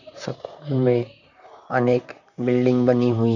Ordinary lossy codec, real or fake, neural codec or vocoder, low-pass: none; fake; vocoder, 44.1 kHz, 128 mel bands, Pupu-Vocoder; 7.2 kHz